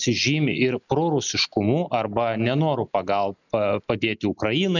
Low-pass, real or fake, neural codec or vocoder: 7.2 kHz; real; none